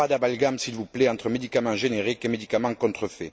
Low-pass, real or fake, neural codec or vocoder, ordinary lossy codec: none; real; none; none